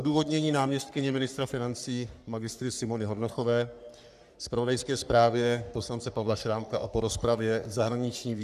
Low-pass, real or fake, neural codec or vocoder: 14.4 kHz; fake; codec, 44.1 kHz, 3.4 kbps, Pupu-Codec